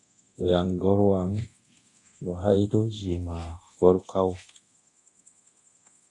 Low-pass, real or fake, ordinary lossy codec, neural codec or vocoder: 10.8 kHz; fake; AAC, 48 kbps; codec, 24 kHz, 0.9 kbps, DualCodec